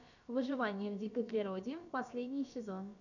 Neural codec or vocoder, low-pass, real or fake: codec, 16 kHz, about 1 kbps, DyCAST, with the encoder's durations; 7.2 kHz; fake